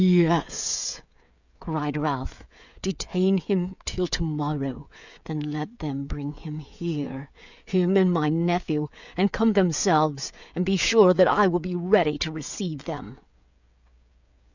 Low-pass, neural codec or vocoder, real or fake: 7.2 kHz; codec, 16 kHz, 16 kbps, FreqCodec, smaller model; fake